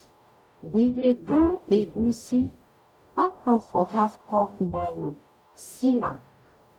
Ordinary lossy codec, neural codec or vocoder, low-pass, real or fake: none; codec, 44.1 kHz, 0.9 kbps, DAC; 19.8 kHz; fake